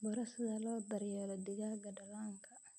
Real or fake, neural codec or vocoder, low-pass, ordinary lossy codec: real; none; none; none